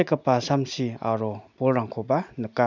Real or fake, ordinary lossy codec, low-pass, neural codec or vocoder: real; none; 7.2 kHz; none